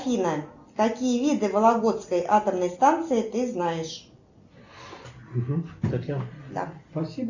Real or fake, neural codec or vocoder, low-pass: real; none; 7.2 kHz